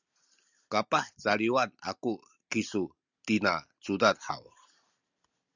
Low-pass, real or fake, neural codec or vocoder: 7.2 kHz; real; none